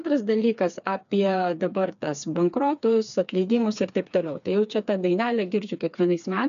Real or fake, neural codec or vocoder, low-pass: fake; codec, 16 kHz, 4 kbps, FreqCodec, smaller model; 7.2 kHz